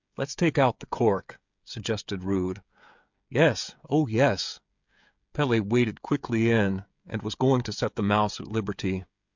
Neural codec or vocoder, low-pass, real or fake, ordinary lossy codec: codec, 16 kHz, 16 kbps, FreqCodec, smaller model; 7.2 kHz; fake; MP3, 64 kbps